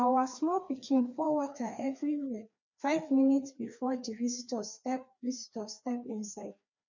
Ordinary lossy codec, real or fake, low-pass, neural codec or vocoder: none; fake; 7.2 kHz; codec, 16 kHz, 2 kbps, FreqCodec, larger model